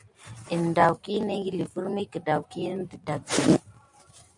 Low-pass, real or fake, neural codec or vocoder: 10.8 kHz; fake; vocoder, 44.1 kHz, 128 mel bands every 512 samples, BigVGAN v2